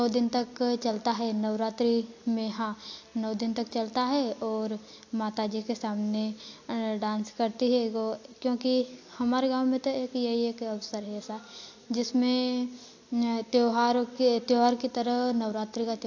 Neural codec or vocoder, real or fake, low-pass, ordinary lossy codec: none; real; 7.2 kHz; AAC, 48 kbps